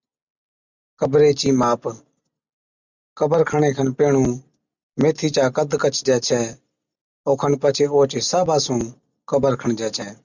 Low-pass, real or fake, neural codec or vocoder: 7.2 kHz; real; none